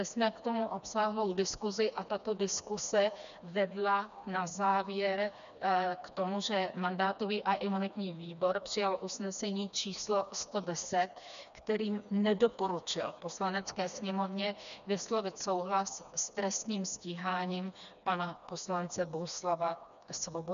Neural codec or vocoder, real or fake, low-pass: codec, 16 kHz, 2 kbps, FreqCodec, smaller model; fake; 7.2 kHz